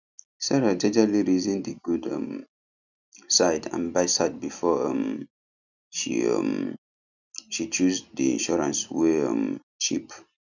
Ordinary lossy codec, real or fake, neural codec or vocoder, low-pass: none; real; none; 7.2 kHz